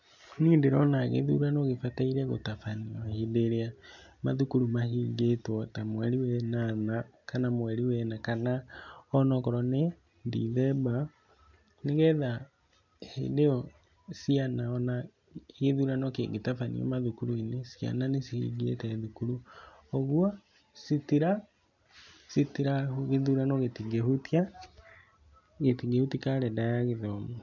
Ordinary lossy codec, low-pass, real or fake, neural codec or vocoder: none; 7.2 kHz; real; none